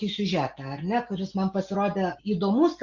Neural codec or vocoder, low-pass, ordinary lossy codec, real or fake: none; 7.2 kHz; Opus, 64 kbps; real